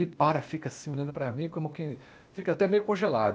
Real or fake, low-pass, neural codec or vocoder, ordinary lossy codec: fake; none; codec, 16 kHz, 0.8 kbps, ZipCodec; none